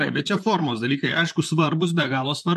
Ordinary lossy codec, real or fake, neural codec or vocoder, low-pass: MP3, 64 kbps; fake; vocoder, 44.1 kHz, 128 mel bands, Pupu-Vocoder; 14.4 kHz